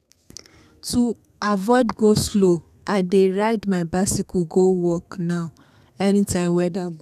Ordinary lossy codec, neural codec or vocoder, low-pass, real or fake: none; codec, 32 kHz, 1.9 kbps, SNAC; 14.4 kHz; fake